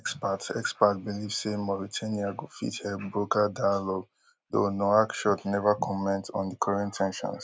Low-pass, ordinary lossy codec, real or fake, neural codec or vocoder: none; none; real; none